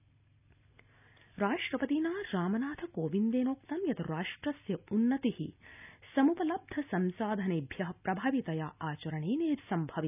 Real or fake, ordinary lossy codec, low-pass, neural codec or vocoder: real; none; 3.6 kHz; none